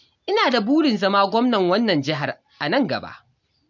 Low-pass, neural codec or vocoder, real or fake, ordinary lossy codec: 7.2 kHz; none; real; none